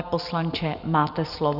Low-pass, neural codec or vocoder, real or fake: 5.4 kHz; none; real